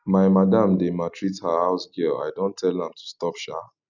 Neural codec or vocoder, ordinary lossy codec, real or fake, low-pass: none; none; real; 7.2 kHz